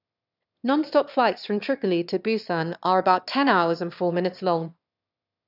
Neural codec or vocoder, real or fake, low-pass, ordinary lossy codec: autoencoder, 22.05 kHz, a latent of 192 numbers a frame, VITS, trained on one speaker; fake; 5.4 kHz; none